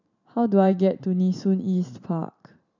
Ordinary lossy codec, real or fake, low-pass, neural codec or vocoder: none; fake; 7.2 kHz; vocoder, 44.1 kHz, 128 mel bands every 512 samples, BigVGAN v2